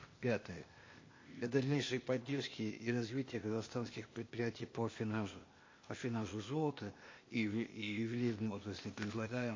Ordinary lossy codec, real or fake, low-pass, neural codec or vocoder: MP3, 32 kbps; fake; 7.2 kHz; codec, 16 kHz, 0.8 kbps, ZipCodec